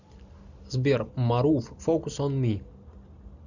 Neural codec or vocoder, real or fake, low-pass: none; real; 7.2 kHz